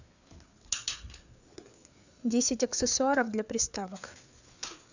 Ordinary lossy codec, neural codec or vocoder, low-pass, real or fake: none; codec, 16 kHz, 4 kbps, FreqCodec, larger model; 7.2 kHz; fake